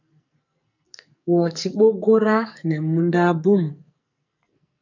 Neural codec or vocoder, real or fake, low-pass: codec, 44.1 kHz, 2.6 kbps, SNAC; fake; 7.2 kHz